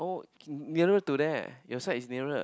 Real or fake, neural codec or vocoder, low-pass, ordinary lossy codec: real; none; none; none